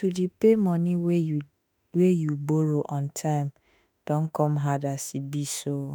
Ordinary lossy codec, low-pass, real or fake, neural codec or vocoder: none; none; fake; autoencoder, 48 kHz, 32 numbers a frame, DAC-VAE, trained on Japanese speech